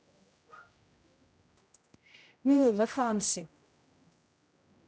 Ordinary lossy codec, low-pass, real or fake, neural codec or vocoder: none; none; fake; codec, 16 kHz, 0.5 kbps, X-Codec, HuBERT features, trained on general audio